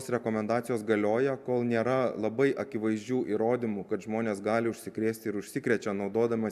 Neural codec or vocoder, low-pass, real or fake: none; 14.4 kHz; real